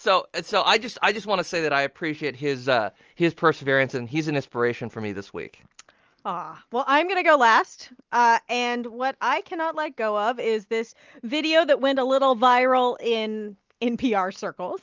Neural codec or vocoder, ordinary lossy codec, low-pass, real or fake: none; Opus, 24 kbps; 7.2 kHz; real